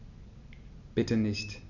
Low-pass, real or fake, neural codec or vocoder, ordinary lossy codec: 7.2 kHz; real; none; none